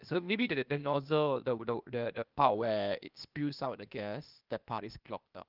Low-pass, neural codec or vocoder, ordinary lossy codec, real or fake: 5.4 kHz; codec, 16 kHz, 0.8 kbps, ZipCodec; Opus, 24 kbps; fake